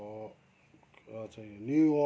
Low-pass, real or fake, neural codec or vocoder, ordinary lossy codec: none; real; none; none